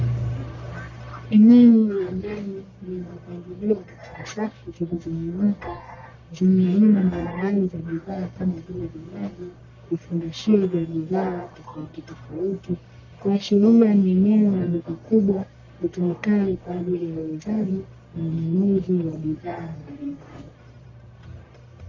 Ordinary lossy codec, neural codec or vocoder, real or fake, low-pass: MP3, 64 kbps; codec, 44.1 kHz, 1.7 kbps, Pupu-Codec; fake; 7.2 kHz